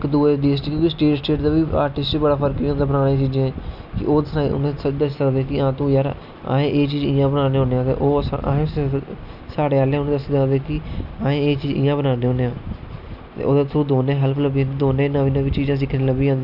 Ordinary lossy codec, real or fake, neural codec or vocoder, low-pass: none; real; none; 5.4 kHz